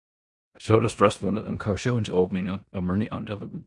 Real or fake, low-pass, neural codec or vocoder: fake; 10.8 kHz; codec, 16 kHz in and 24 kHz out, 0.9 kbps, LongCat-Audio-Codec, four codebook decoder